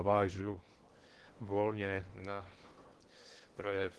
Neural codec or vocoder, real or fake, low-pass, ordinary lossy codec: codec, 16 kHz in and 24 kHz out, 0.8 kbps, FocalCodec, streaming, 65536 codes; fake; 10.8 kHz; Opus, 16 kbps